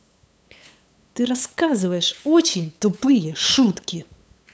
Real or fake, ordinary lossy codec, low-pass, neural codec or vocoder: fake; none; none; codec, 16 kHz, 8 kbps, FunCodec, trained on LibriTTS, 25 frames a second